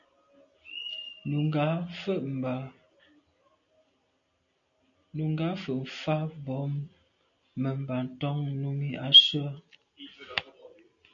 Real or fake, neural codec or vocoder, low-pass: real; none; 7.2 kHz